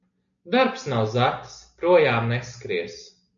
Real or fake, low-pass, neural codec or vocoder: real; 7.2 kHz; none